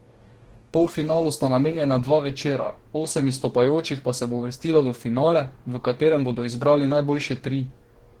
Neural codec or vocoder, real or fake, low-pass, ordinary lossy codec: codec, 44.1 kHz, 2.6 kbps, DAC; fake; 19.8 kHz; Opus, 16 kbps